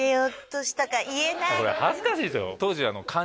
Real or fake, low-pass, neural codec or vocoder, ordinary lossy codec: real; none; none; none